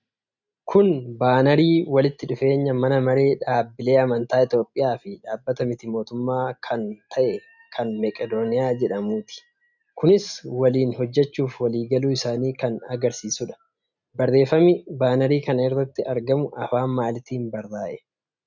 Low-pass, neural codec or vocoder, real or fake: 7.2 kHz; none; real